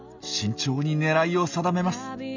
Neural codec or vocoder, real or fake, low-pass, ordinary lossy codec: none; real; 7.2 kHz; none